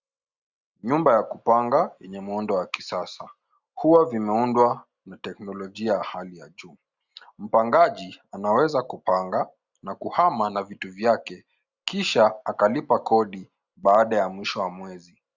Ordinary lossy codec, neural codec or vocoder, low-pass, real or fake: Opus, 64 kbps; none; 7.2 kHz; real